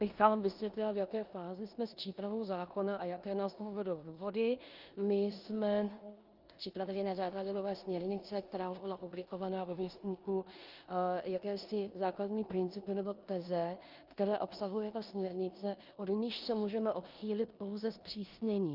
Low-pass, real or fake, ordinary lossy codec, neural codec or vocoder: 5.4 kHz; fake; Opus, 24 kbps; codec, 16 kHz in and 24 kHz out, 0.9 kbps, LongCat-Audio-Codec, four codebook decoder